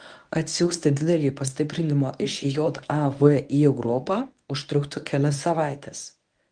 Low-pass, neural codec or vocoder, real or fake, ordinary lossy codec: 9.9 kHz; codec, 24 kHz, 0.9 kbps, WavTokenizer, medium speech release version 1; fake; Opus, 32 kbps